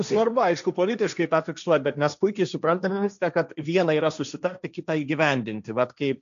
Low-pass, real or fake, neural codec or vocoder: 7.2 kHz; fake; codec, 16 kHz, 1.1 kbps, Voila-Tokenizer